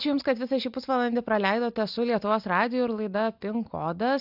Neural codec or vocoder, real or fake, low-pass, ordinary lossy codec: none; real; 5.4 kHz; AAC, 48 kbps